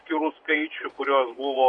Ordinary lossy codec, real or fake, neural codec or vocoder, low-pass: MP3, 48 kbps; fake; vocoder, 24 kHz, 100 mel bands, Vocos; 10.8 kHz